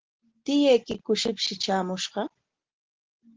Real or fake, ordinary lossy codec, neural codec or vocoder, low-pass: real; Opus, 16 kbps; none; 7.2 kHz